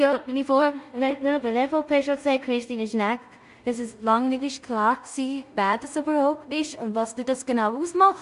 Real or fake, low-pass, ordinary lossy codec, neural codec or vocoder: fake; 10.8 kHz; Opus, 64 kbps; codec, 16 kHz in and 24 kHz out, 0.4 kbps, LongCat-Audio-Codec, two codebook decoder